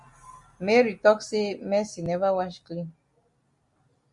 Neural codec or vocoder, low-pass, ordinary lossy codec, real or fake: none; 10.8 kHz; Opus, 64 kbps; real